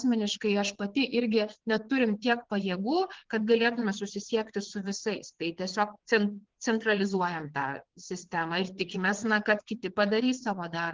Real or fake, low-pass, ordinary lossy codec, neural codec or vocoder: fake; 7.2 kHz; Opus, 16 kbps; vocoder, 22.05 kHz, 80 mel bands, WaveNeXt